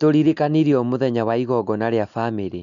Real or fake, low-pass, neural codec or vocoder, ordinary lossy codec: real; 7.2 kHz; none; none